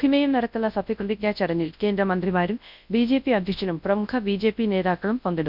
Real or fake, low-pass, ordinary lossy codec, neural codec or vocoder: fake; 5.4 kHz; none; codec, 24 kHz, 0.9 kbps, WavTokenizer, large speech release